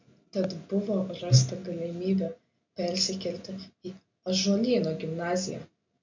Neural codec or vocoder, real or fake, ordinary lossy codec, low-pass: none; real; MP3, 64 kbps; 7.2 kHz